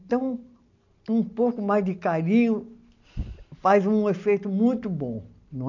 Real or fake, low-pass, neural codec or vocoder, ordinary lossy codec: real; 7.2 kHz; none; none